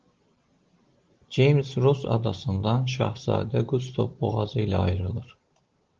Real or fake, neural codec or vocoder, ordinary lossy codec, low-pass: real; none; Opus, 16 kbps; 7.2 kHz